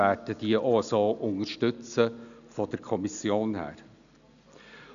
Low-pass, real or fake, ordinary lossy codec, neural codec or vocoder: 7.2 kHz; real; none; none